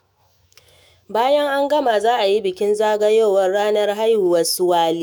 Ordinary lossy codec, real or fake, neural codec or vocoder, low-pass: none; fake; autoencoder, 48 kHz, 128 numbers a frame, DAC-VAE, trained on Japanese speech; none